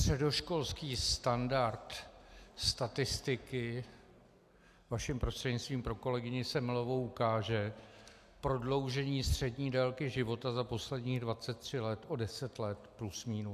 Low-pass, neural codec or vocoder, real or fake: 14.4 kHz; none; real